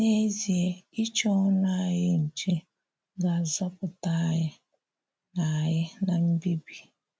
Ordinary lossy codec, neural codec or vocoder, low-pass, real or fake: none; none; none; real